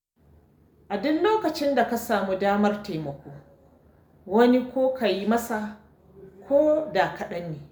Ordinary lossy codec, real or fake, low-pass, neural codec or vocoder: none; real; none; none